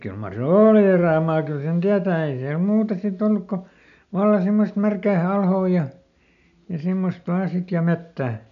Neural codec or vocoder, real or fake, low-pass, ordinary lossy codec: none; real; 7.2 kHz; none